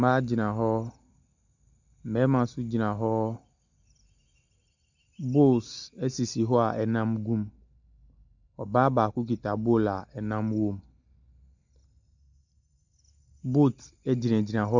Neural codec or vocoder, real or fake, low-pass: none; real; 7.2 kHz